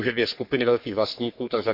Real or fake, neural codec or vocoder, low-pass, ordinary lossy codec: fake; codec, 44.1 kHz, 3.4 kbps, Pupu-Codec; 5.4 kHz; none